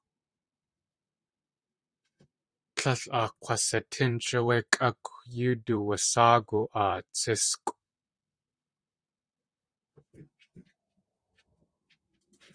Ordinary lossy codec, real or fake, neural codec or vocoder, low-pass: Opus, 64 kbps; fake; vocoder, 44.1 kHz, 128 mel bands, Pupu-Vocoder; 9.9 kHz